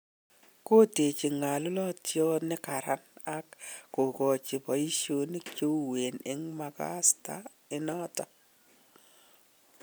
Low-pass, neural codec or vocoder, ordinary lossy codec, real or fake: none; none; none; real